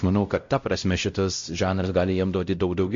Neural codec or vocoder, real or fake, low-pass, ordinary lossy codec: codec, 16 kHz, 0.5 kbps, X-Codec, WavLM features, trained on Multilingual LibriSpeech; fake; 7.2 kHz; MP3, 48 kbps